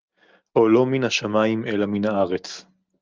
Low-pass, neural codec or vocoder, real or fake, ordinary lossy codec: 7.2 kHz; none; real; Opus, 24 kbps